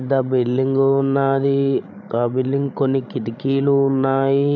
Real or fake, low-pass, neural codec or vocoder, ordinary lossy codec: fake; none; codec, 16 kHz, 16 kbps, FreqCodec, larger model; none